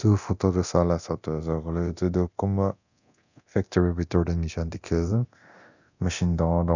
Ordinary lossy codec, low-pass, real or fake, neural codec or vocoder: none; 7.2 kHz; fake; codec, 24 kHz, 0.9 kbps, DualCodec